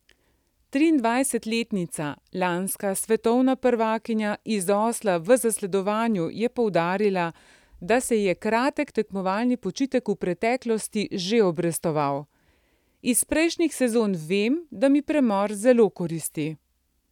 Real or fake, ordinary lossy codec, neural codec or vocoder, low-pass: real; none; none; 19.8 kHz